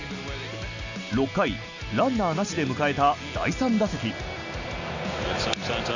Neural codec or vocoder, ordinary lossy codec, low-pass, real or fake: none; none; 7.2 kHz; real